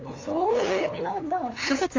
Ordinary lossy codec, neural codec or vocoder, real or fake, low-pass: AAC, 32 kbps; codec, 16 kHz, 8 kbps, FunCodec, trained on LibriTTS, 25 frames a second; fake; 7.2 kHz